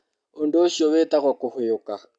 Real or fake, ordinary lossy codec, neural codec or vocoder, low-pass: real; none; none; 9.9 kHz